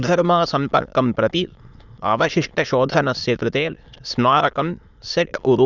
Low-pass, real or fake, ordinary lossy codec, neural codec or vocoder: 7.2 kHz; fake; none; autoencoder, 22.05 kHz, a latent of 192 numbers a frame, VITS, trained on many speakers